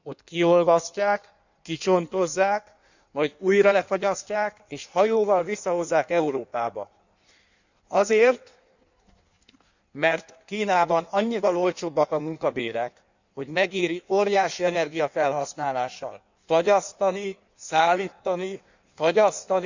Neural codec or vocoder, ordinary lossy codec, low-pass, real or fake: codec, 16 kHz in and 24 kHz out, 1.1 kbps, FireRedTTS-2 codec; none; 7.2 kHz; fake